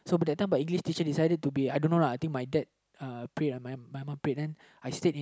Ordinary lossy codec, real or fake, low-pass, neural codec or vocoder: none; real; none; none